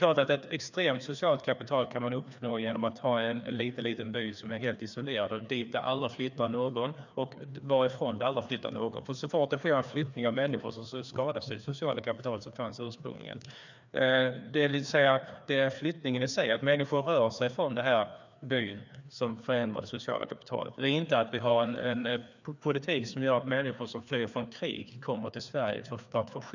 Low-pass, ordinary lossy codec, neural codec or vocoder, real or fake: 7.2 kHz; none; codec, 16 kHz, 2 kbps, FreqCodec, larger model; fake